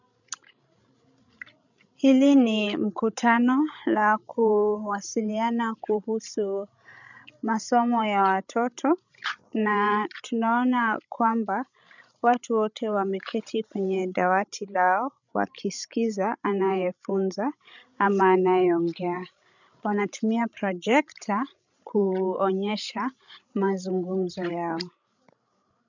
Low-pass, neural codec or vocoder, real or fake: 7.2 kHz; codec, 16 kHz, 16 kbps, FreqCodec, larger model; fake